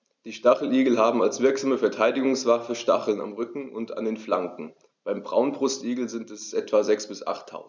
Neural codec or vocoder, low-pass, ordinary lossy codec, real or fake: none; none; none; real